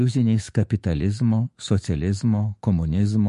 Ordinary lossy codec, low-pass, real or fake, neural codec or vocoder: MP3, 48 kbps; 14.4 kHz; fake; autoencoder, 48 kHz, 128 numbers a frame, DAC-VAE, trained on Japanese speech